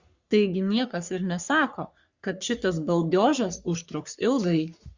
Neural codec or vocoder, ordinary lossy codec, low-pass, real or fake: codec, 44.1 kHz, 3.4 kbps, Pupu-Codec; Opus, 64 kbps; 7.2 kHz; fake